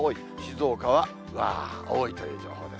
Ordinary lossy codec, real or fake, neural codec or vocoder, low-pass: none; real; none; none